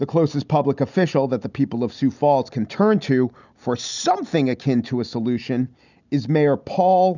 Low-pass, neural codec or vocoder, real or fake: 7.2 kHz; none; real